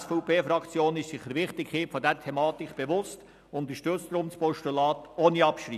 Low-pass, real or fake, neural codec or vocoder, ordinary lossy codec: 14.4 kHz; real; none; none